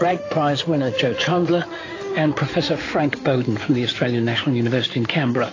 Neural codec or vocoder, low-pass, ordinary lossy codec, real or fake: codec, 16 kHz in and 24 kHz out, 2.2 kbps, FireRedTTS-2 codec; 7.2 kHz; AAC, 32 kbps; fake